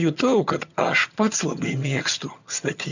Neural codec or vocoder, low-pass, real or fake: vocoder, 22.05 kHz, 80 mel bands, HiFi-GAN; 7.2 kHz; fake